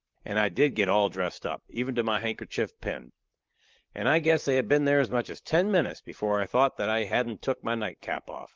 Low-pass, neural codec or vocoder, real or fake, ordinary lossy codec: 7.2 kHz; vocoder, 44.1 kHz, 128 mel bands, Pupu-Vocoder; fake; Opus, 32 kbps